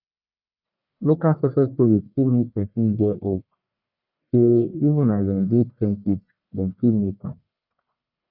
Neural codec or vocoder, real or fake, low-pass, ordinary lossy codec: codec, 44.1 kHz, 1.7 kbps, Pupu-Codec; fake; 5.4 kHz; none